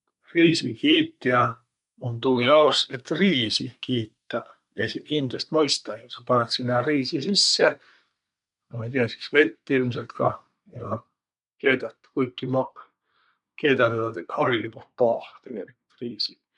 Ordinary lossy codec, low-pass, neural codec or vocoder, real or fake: none; 10.8 kHz; codec, 24 kHz, 1 kbps, SNAC; fake